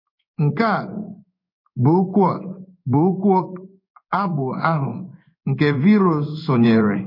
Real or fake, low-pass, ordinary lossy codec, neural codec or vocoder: fake; 5.4 kHz; MP3, 32 kbps; codec, 16 kHz in and 24 kHz out, 1 kbps, XY-Tokenizer